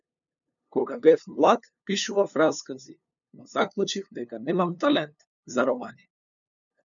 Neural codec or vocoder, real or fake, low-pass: codec, 16 kHz, 2 kbps, FunCodec, trained on LibriTTS, 25 frames a second; fake; 7.2 kHz